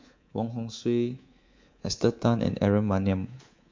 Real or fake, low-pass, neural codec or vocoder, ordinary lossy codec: fake; 7.2 kHz; codec, 24 kHz, 3.1 kbps, DualCodec; MP3, 48 kbps